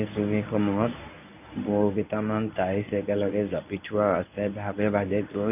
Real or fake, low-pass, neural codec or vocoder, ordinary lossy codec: fake; 3.6 kHz; codec, 24 kHz, 0.9 kbps, WavTokenizer, medium speech release version 1; none